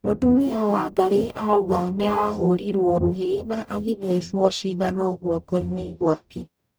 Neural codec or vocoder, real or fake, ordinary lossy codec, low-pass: codec, 44.1 kHz, 0.9 kbps, DAC; fake; none; none